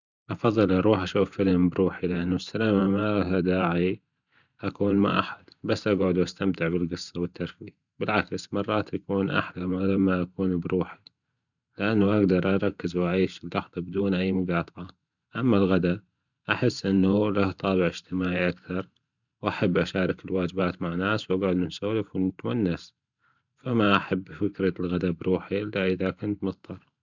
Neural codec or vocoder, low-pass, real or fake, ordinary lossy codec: vocoder, 44.1 kHz, 128 mel bands every 512 samples, BigVGAN v2; 7.2 kHz; fake; none